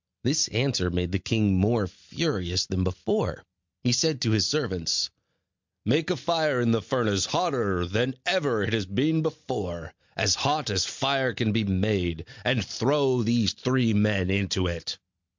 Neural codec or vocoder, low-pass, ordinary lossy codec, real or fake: none; 7.2 kHz; MP3, 64 kbps; real